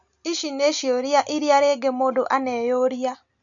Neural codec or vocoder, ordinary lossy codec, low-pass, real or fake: none; none; 7.2 kHz; real